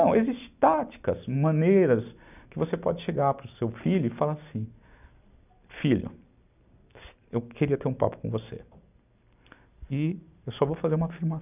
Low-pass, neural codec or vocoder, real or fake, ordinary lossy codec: 3.6 kHz; none; real; none